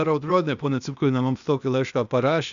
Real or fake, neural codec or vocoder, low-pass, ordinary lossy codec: fake; codec, 16 kHz, 0.8 kbps, ZipCodec; 7.2 kHz; MP3, 96 kbps